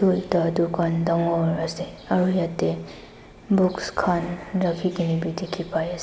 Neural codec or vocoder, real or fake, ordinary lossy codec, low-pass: none; real; none; none